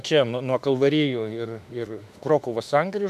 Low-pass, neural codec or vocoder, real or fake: 14.4 kHz; autoencoder, 48 kHz, 32 numbers a frame, DAC-VAE, trained on Japanese speech; fake